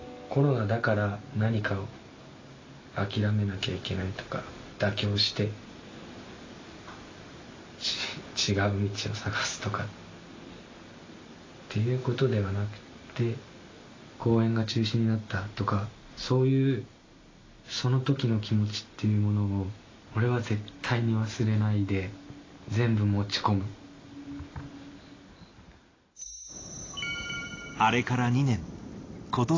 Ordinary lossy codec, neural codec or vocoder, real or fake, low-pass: AAC, 32 kbps; none; real; 7.2 kHz